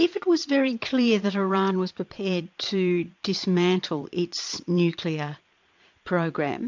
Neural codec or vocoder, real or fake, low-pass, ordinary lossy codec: vocoder, 22.05 kHz, 80 mel bands, Vocos; fake; 7.2 kHz; MP3, 64 kbps